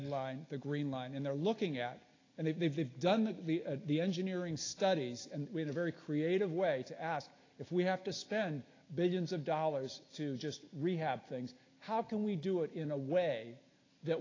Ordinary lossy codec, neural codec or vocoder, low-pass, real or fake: AAC, 32 kbps; none; 7.2 kHz; real